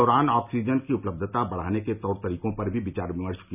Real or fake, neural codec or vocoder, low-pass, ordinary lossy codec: real; none; 3.6 kHz; none